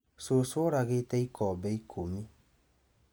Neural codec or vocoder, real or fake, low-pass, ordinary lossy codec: none; real; none; none